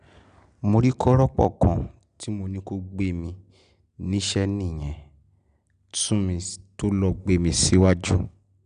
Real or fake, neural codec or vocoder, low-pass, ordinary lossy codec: real; none; 9.9 kHz; none